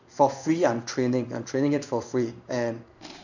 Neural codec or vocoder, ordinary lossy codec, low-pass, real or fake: codec, 16 kHz in and 24 kHz out, 1 kbps, XY-Tokenizer; none; 7.2 kHz; fake